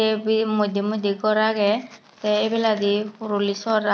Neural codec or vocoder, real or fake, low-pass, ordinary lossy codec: none; real; none; none